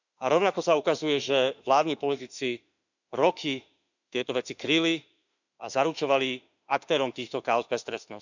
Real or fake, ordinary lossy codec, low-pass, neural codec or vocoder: fake; none; 7.2 kHz; autoencoder, 48 kHz, 32 numbers a frame, DAC-VAE, trained on Japanese speech